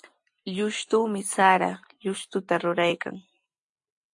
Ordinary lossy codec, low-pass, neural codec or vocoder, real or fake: AAC, 64 kbps; 10.8 kHz; none; real